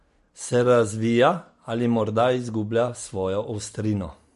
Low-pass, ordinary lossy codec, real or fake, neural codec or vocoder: 14.4 kHz; MP3, 48 kbps; real; none